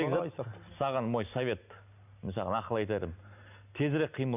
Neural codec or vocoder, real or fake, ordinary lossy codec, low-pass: none; real; none; 3.6 kHz